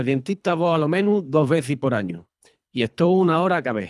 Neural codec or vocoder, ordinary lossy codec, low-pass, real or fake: codec, 24 kHz, 3 kbps, HILCodec; none; none; fake